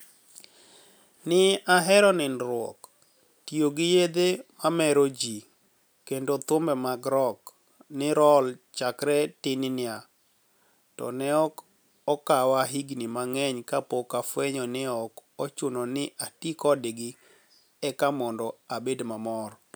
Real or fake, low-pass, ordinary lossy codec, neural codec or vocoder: real; none; none; none